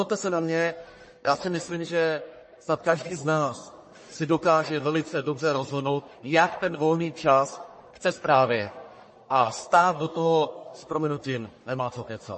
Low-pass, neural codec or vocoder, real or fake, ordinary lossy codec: 10.8 kHz; codec, 44.1 kHz, 1.7 kbps, Pupu-Codec; fake; MP3, 32 kbps